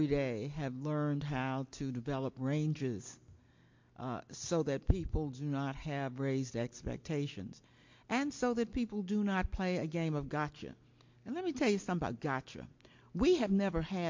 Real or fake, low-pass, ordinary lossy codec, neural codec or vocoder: real; 7.2 kHz; MP3, 48 kbps; none